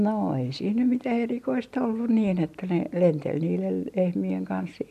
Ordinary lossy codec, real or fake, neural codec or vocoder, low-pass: none; real; none; 14.4 kHz